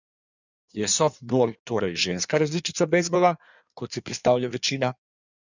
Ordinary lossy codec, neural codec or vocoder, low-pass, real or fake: none; codec, 16 kHz in and 24 kHz out, 1.1 kbps, FireRedTTS-2 codec; 7.2 kHz; fake